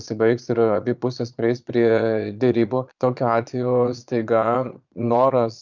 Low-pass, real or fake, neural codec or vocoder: 7.2 kHz; fake; vocoder, 22.05 kHz, 80 mel bands, Vocos